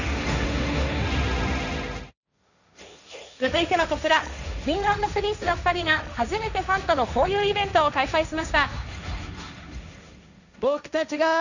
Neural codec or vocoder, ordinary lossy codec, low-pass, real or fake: codec, 16 kHz, 1.1 kbps, Voila-Tokenizer; none; 7.2 kHz; fake